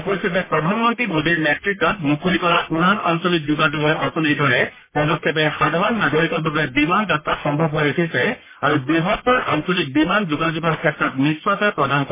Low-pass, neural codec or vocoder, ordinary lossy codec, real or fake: 3.6 kHz; codec, 44.1 kHz, 1.7 kbps, Pupu-Codec; MP3, 16 kbps; fake